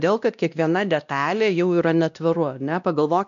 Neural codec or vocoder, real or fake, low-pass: codec, 16 kHz, 1 kbps, X-Codec, WavLM features, trained on Multilingual LibriSpeech; fake; 7.2 kHz